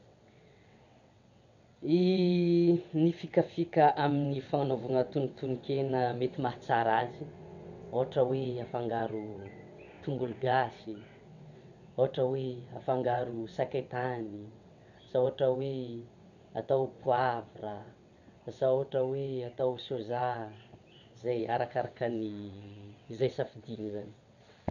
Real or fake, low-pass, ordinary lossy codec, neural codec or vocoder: fake; 7.2 kHz; none; vocoder, 22.05 kHz, 80 mel bands, WaveNeXt